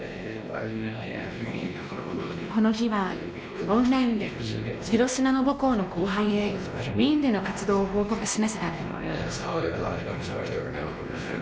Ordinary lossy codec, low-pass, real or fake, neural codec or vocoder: none; none; fake; codec, 16 kHz, 1 kbps, X-Codec, WavLM features, trained on Multilingual LibriSpeech